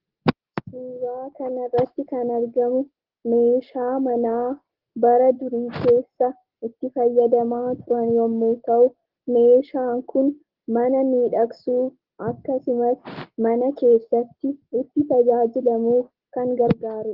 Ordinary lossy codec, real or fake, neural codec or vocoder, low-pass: Opus, 16 kbps; real; none; 5.4 kHz